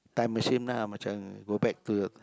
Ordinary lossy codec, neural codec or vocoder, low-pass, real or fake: none; none; none; real